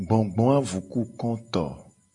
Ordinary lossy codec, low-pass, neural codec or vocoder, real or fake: MP3, 48 kbps; 10.8 kHz; none; real